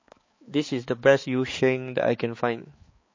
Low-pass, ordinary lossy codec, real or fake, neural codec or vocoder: 7.2 kHz; MP3, 32 kbps; fake; codec, 16 kHz, 4 kbps, X-Codec, HuBERT features, trained on balanced general audio